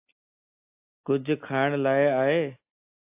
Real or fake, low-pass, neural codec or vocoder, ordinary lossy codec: real; 3.6 kHz; none; AAC, 32 kbps